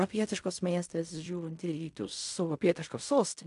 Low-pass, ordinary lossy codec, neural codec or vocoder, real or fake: 10.8 kHz; MP3, 64 kbps; codec, 16 kHz in and 24 kHz out, 0.4 kbps, LongCat-Audio-Codec, fine tuned four codebook decoder; fake